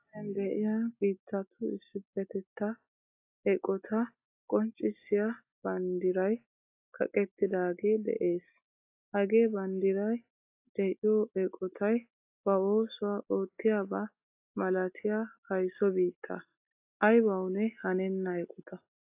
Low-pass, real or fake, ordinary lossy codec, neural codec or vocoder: 3.6 kHz; real; AAC, 32 kbps; none